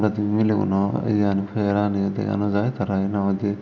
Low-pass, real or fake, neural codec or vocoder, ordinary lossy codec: 7.2 kHz; real; none; none